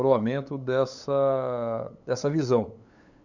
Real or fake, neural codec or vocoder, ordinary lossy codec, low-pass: fake; codec, 16 kHz, 8 kbps, FunCodec, trained on LibriTTS, 25 frames a second; none; 7.2 kHz